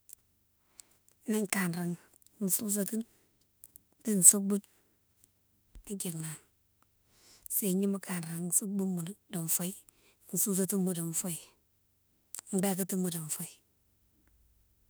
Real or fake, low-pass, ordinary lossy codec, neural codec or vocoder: fake; none; none; autoencoder, 48 kHz, 32 numbers a frame, DAC-VAE, trained on Japanese speech